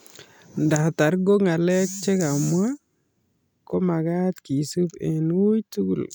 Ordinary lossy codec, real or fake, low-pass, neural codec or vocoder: none; real; none; none